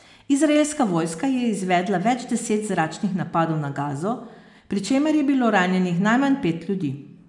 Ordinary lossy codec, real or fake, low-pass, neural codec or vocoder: none; real; 10.8 kHz; none